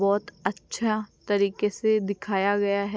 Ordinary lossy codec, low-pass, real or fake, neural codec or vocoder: none; none; real; none